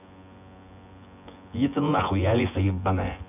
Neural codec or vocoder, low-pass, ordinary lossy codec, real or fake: vocoder, 24 kHz, 100 mel bands, Vocos; 3.6 kHz; none; fake